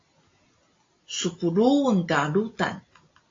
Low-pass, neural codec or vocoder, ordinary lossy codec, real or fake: 7.2 kHz; none; AAC, 32 kbps; real